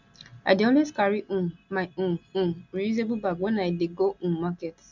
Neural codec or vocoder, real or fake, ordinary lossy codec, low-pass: none; real; none; 7.2 kHz